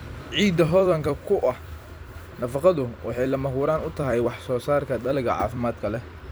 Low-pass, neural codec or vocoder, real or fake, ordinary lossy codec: none; vocoder, 44.1 kHz, 128 mel bands every 256 samples, BigVGAN v2; fake; none